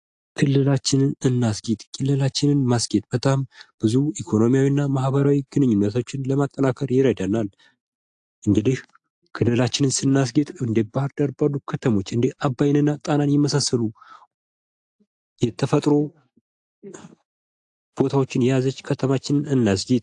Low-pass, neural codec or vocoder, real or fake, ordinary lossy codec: 10.8 kHz; none; real; AAC, 64 kbps